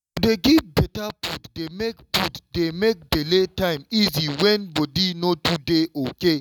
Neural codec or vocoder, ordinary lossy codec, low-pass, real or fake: none; none; 19.8 kHz; real